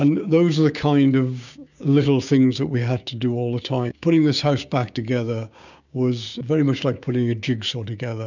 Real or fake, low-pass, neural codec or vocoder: fake; 7.2 kHz; codec, 16 kHz, 6 kbps, DAC